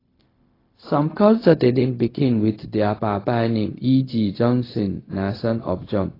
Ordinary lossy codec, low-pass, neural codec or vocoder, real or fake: AAC, 24 kbps; 5.4 kHz; codec, 16 kHz, 0.4 kbps, LongCat-Audio-Codec; fake